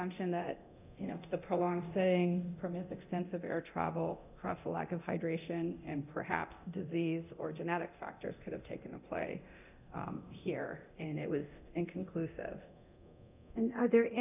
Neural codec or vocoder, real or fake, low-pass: codec, 24 kHz, 0.9 kbps, DualCodec; fake; 3.6 kHz